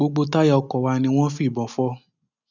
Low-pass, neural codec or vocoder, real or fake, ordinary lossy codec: 7.2 kHz; none; real; none